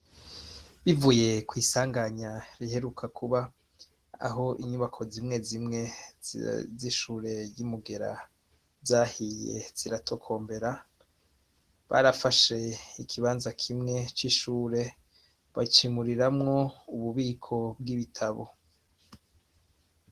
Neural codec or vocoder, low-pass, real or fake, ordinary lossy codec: none; 14.4 kHz; real; Opus, 16 kbps